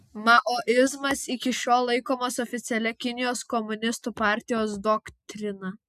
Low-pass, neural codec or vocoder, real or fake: 14.4 kHz; none; real